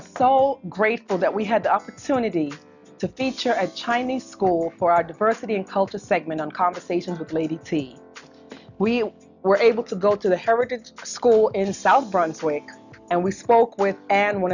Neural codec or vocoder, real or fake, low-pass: none; real; 7.2 kHz